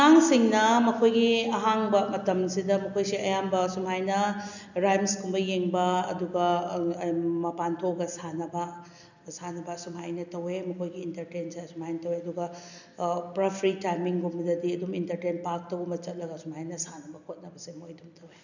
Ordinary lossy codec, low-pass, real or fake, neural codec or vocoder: none; 7.2 kHz; real; none